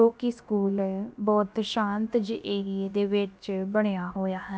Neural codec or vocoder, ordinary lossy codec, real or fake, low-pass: codec, 16 kHz, about 1 kbps, DyCAST, with the encoder's durations; none; fake; none